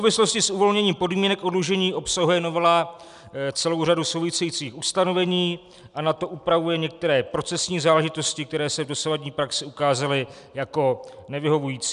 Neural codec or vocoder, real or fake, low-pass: none; real; 10.8 kHz